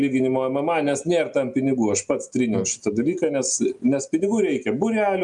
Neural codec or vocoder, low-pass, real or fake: none; 10.8 kHz; real